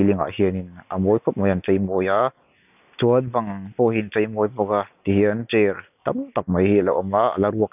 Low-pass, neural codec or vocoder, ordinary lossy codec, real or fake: 3.6 kHz; none; none; real